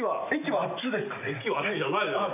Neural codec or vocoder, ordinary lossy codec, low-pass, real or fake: none; none; 3.6 kHz; real